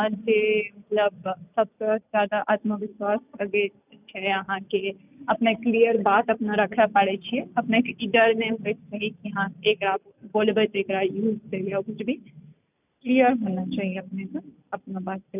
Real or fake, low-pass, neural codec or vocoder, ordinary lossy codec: real; 3.6 kHz; none; none